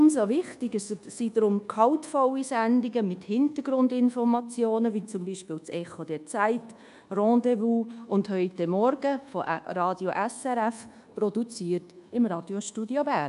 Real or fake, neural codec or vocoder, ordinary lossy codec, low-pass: fake; codec, 24 kHz, 1.2 kbps, DualCodec; MP3, 96 kbps; 10.8 kHz